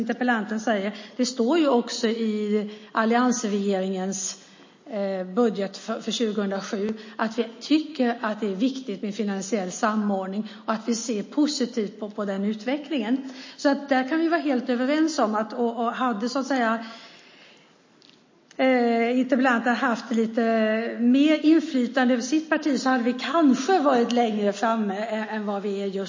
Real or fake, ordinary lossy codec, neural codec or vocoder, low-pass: real; MP3, 32 kbps; none; 7.2 kHz